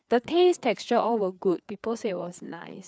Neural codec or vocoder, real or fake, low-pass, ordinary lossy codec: codec, 16 kHz, 4 kbps, FreqCodec, larger model; fake; none; none